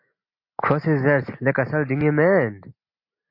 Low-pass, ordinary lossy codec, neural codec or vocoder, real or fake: 5.4 kHz; MP3, 32 kbps; none; real